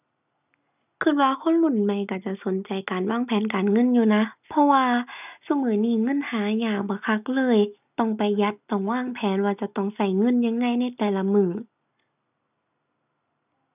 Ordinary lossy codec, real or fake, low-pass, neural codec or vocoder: none; real; 3.6 kHz; none